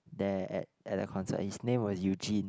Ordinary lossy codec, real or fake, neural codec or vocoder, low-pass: none; real; none; none